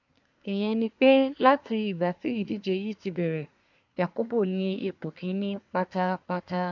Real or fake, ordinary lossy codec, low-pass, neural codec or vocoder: fake; MP3, 64 kbps; 7.2 kHz; codec, 24 kHz, 1 kbps, SNAC